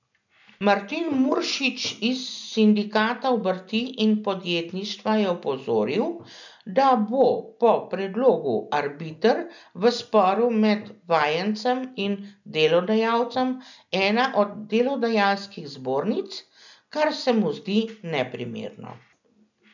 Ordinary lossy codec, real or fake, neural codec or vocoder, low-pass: none; real; none; 7.2 kHz